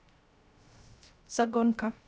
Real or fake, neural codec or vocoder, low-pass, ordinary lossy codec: fake; codec, 16 kHz, 0.3 kbps, FocalCodec; none; none